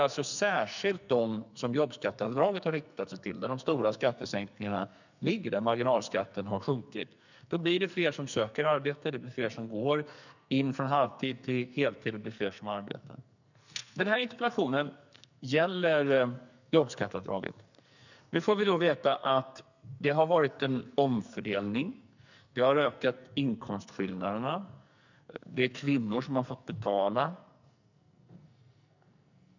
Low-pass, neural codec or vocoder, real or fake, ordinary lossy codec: 7.2 kHz; codec, 44.1 kHz, 2.6 kbps, SNAC; fake; none